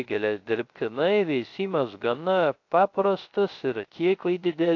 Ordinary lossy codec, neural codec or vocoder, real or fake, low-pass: AAC, 48 kbps; codec, 16 kHz, 0.3 kbps, FocalCodec; fake; 7.2 kHz